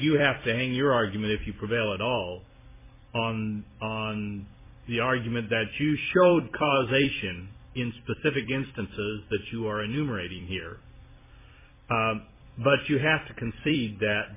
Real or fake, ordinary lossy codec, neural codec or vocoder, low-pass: fake; MP3, 16 kbps; vocoder, 44.1 kHz, 128 mel bands every 256 samples, BigVGAN v2; 3.6 kHz